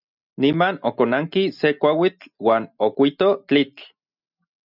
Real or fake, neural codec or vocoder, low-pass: real; none; 5.4 kHz